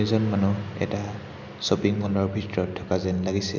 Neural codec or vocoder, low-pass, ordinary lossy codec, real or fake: none; 7.2 kHz; none; real